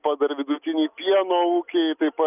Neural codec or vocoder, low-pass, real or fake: none; 3.6 kHz; real